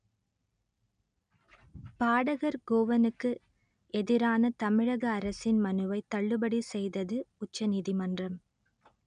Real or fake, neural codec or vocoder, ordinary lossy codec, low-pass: real; none; none; 9.9 kHz